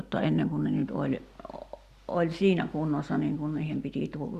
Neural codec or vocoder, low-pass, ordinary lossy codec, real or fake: none; 14.4 kHz; Opus, 64 kbps; real